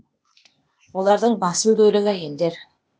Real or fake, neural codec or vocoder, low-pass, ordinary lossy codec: fake; codec, 16 kHz, 0.8 kbps, ZipCodec; none; none